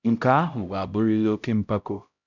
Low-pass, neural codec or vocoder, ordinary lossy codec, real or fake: 7.2 kHz; codec, 16 kHz, 0.5 kbps, X-Codec, HuBERT features, trained on LibriSpeech; none; fake